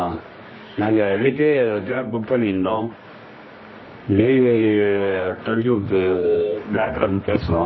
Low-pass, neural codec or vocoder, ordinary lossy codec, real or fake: 7.2 kHz; codec, 24 kHz, 0.9 kbps, WavTokenizer, medium music audio release; MP3, 24 kbps; fake